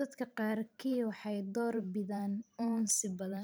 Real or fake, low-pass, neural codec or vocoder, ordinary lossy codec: fake; none; vocoder, 44.1 kHz, 128 mel bands every 512 samples, BigVGAN v2; none